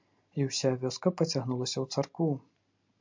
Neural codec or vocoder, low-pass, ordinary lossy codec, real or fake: none; 7.2 kHz; MP3, 64 kbps; real